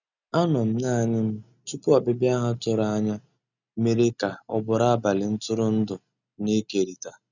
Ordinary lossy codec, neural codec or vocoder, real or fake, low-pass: none; none; real; 7.2 kHz